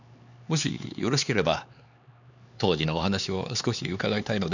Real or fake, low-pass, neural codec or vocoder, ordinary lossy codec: fake; 7.2 kHz; codec, 16 kHz, 4 kbps, X-Codec, HuBERT features, trained on LibriSpeech; none